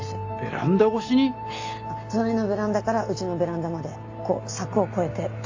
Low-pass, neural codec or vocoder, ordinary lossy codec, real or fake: 7.2 kHz; none; AAC, 48 kbps; real